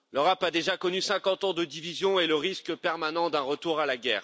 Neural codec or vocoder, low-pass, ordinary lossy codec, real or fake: none; none; none; real